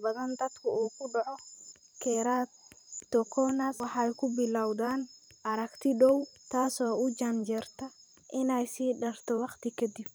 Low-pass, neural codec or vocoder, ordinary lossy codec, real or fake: none; vocoder, 44.1 kHz, 128 mel bands every 512 samples, BigVGAN v2; none; fake